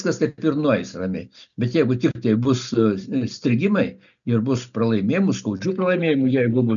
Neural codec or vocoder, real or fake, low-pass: none; real; 7.2 kHz